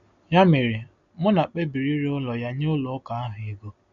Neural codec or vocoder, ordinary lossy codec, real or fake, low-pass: none; none; real; 7.2 kHz